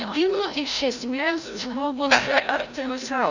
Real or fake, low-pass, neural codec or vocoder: fake; 7.2 kHz; codec, 16 kHz, 0.5 kbps, FreqCodec, larger model